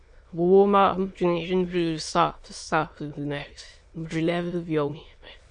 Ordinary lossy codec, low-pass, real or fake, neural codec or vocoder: MP3, 48 kbps; 9.9 kHz; fake; autoencoder, 22.05 kHz, a latent of 192 numbers a frame, VITS, trained on many speakers